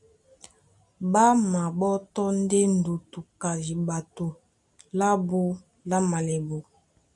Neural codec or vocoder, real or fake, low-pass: none; real; 10.8 kHz